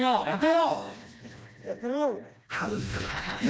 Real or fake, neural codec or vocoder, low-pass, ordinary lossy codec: fake; codec, 16 kHz, 1 kbps, FreqCodec, smaller model; none; none